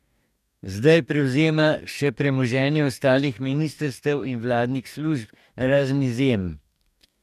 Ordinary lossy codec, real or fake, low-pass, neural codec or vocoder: none; fake; 14.4 kHz; codec, 44.1 kHz, 2.6 kbps, DAC